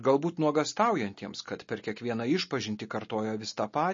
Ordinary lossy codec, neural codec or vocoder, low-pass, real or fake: MP3, 32 kbps; none; 7.2 kHz; real